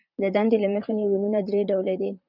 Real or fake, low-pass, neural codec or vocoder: fake; 5.4 kHz; vocoder, 44.1 kHz, 128 mel bands, Pupu-Vocoder